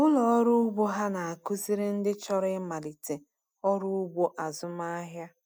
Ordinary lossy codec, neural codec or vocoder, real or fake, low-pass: none; none; real; none